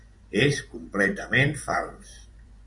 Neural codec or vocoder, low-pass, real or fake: vocoder, 24 kHz, 100 mel bands, Vocos; 10.8 kHz; fake